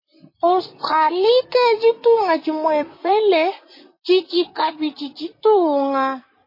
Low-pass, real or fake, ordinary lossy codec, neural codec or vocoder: 5.4 kHz; fake; MP3, 24 kbps; vocoder, 44.1 kHz, 128 mel bands, Pupu-Vocoder